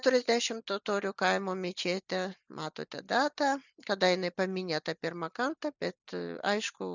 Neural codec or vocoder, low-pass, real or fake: none; 7.2 kHz; real